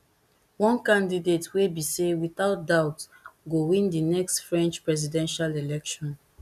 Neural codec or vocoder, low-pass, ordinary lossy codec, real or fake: none; 14.4 kHz; none; real